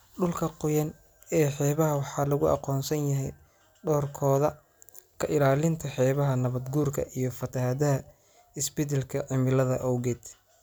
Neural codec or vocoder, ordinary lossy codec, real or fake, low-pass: none; none; real; none